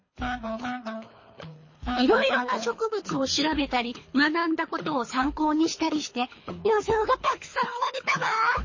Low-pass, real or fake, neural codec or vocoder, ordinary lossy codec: 7.2 kHz; fake; codec, 24 kHz, 3 kbps, HILCodec; MP3, 32 kbps